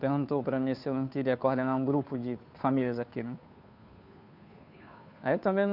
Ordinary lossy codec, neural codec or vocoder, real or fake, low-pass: none; codec, 16 kHz, 2 kbps, FunCodec, trained on Chinese and English, 25 frames a second; fake; 5.4 kHz